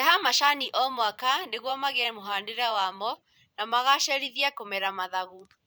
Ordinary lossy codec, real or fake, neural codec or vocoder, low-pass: none; fake; vocoder, 44.1 kHz, 128 mel bands every 512 samples, BigVGAN v2; none